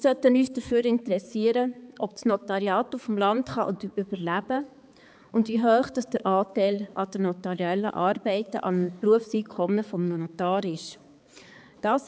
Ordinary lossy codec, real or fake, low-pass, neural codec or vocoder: none; fake; none; codec, 16 kHz, 4 kbps, X-Codec, HuBERT features, trained on balanced general audio